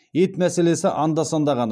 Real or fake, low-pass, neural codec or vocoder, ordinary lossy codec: real; none; none; none